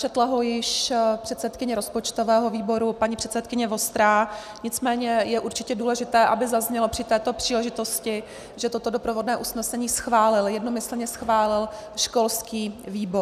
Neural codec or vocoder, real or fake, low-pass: none; real; 14.4 kHz